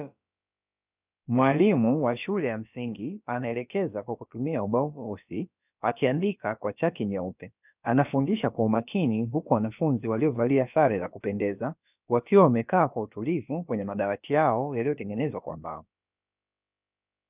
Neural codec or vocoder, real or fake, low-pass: codec, 16 kHz, about 1 kbps, DyCAST, with the encoder's durations; fake; 3.6 kHz